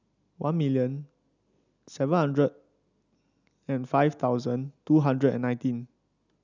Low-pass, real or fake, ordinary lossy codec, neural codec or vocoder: 7.2 kHz; real; none; none